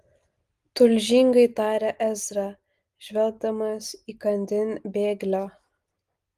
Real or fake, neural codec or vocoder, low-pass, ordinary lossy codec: real; none; 14.4 kHz; Opus, 24 kbps